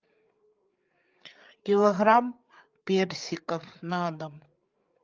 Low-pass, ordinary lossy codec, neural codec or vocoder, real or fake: 7.2 kHz; Opus, 24 kbps; codec, 16 kHz, 8 kbps, FreqCodec, larger model; fake